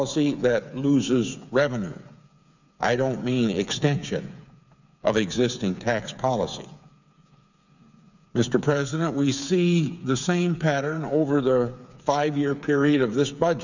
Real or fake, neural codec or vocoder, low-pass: fake; codec, 16 kHz, 8 kbps, FreqCodec, smaller model; 7.2 kHz